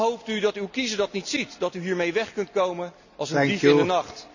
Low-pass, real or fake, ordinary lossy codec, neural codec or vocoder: 7.2 kHz; real; none; none